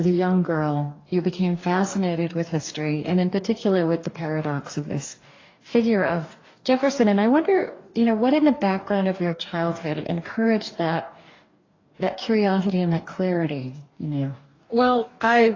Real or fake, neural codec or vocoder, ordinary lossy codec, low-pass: fake; codec, 44.1 kHz, 2.6 kbps, DAC; AAC, 32 kbps; 7.2 kHz